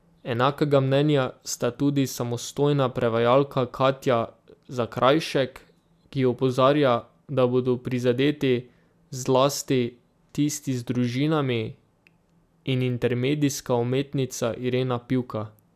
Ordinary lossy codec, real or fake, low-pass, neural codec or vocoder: AAC, 96 kbps; real; 14.4 kHz; none